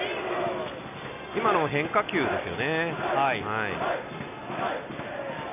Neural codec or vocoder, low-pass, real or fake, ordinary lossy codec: none; 3.6 kHz; real; AAC, 32 kbps